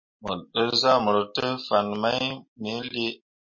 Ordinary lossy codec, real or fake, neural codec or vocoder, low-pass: MP3, 32 kbps; real; none; 7.2 kHz